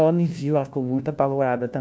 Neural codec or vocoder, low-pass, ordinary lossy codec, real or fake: codec, 16 kHz, 1 kbps, FunCodec, trained on LibriTTS, 50 frames a second; none; none; fake